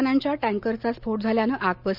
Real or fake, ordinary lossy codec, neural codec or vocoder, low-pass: real; none; none; 5.4 kHz